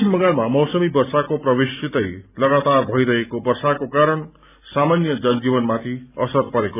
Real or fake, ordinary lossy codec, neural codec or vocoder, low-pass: real; none; none; 3.6 kHz